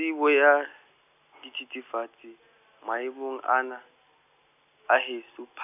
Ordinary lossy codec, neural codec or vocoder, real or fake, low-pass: none; none; real; 3.6 kHz